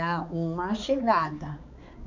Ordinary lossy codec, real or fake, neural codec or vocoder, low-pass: none; fake; codec, 16 kHz, 4 kbps, X-Codec, HuBERT features, trained on balanced general audio; 7.2 kHz